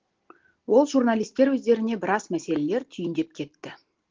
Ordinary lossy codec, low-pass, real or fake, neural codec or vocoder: Opus, 16 kbps; 7.2 kHz; real; none